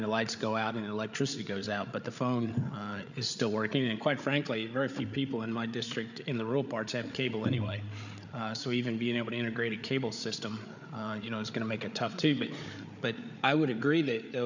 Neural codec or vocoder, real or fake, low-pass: codec, 16 kHz, 8 kbps, FreqCodec, larger model; fake; 7.2 kHz